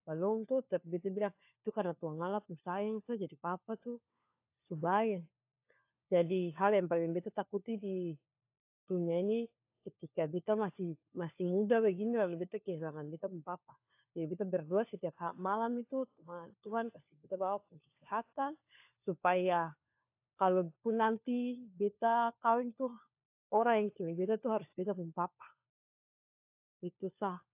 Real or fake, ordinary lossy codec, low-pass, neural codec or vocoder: fake; AAC, 32 kbps; 3.6 kHz; codec, 16 kHz, 4 kbps, FunCodec, trained on LibriTTS, 50 frames a second